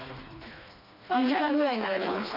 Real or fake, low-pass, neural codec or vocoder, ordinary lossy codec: fake; 5.4 kHz; codec, 16 kHz, 2 kbps, FreqCodec, smaller model; none